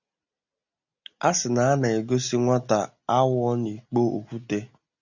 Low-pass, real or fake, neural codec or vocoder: 7.2 kHz; real; none